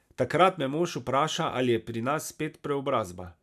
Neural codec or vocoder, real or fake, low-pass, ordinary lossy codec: none; real; 14.4 kHz; none